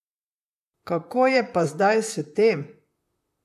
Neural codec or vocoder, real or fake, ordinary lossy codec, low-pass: vocoder, 44.1 kHz, 128 mel bands, Pupu-Vocoder; fake; none; 14.4 kHz